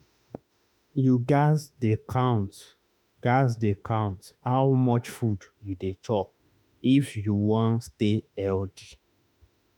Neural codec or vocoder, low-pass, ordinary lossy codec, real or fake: autoencoder, 48 kHz, 32 numbers a frame, DAC-VAE, trained on Japanese speech; 19.8 kHz; none; fake